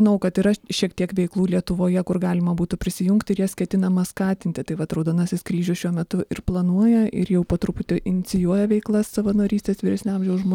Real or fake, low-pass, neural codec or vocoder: real; 19.8 kHz; none